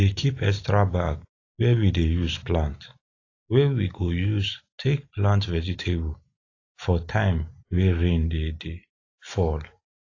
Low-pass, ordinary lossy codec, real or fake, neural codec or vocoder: 7.2 kHz; AAC, 32 kbps; real; none